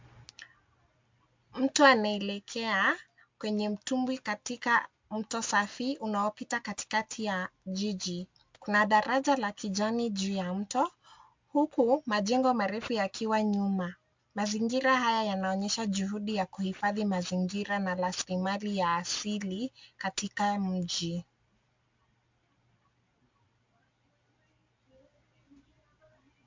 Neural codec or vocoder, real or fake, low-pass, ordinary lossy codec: none; real; 7.2 kHz; MP3, 64 kbps